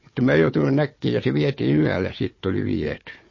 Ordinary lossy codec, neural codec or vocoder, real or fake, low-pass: MP3, 32 kbps; codec, 16 kHz, 4 kbps, FunCodec, trained on Chinese and English, 50 frames a second; fake; 7.2 kHz